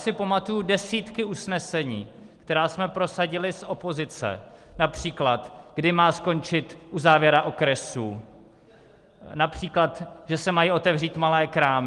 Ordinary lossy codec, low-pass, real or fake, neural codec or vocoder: Opus, 24 kbps; 10.8 kHz; real; none